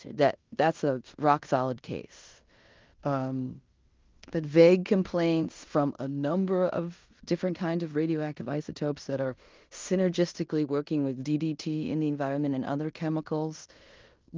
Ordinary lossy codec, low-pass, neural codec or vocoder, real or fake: Opus, 32 kbps; 7.2 kHz; codec, 16 kHz in and 24 kHz out, 0.9 kbps, LongCat-Audio-Codec, fine tuned four codebook decoder; fake